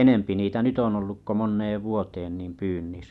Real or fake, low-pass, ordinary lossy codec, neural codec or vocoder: real; none; none; none